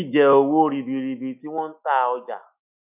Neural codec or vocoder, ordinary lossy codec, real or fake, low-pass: autoencoder, 48 kHz, 128 numbers a frame, DAC-VAE, trained on Japanese speech; none; fake; 3.6 kHz